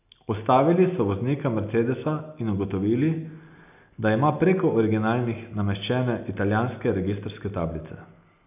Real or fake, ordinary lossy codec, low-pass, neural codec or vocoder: real; none; 3.6 kHz; none